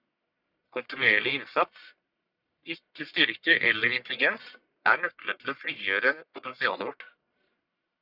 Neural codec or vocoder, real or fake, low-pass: codec, 44.1 kHz, 1.7 kbps, Pupu-Codec; fake; 5.4 kHz